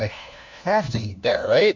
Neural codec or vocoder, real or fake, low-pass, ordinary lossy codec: codec, 16 kHz, 1 kbps, FunCodec, trained on LibriTTS, 50 frames a second; fake; 7.2 kHz; MP3, 48 kbps